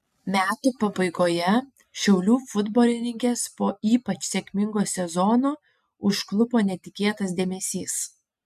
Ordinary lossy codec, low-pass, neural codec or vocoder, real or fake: MP3, 96 kbps; 14.4 kHz; none; real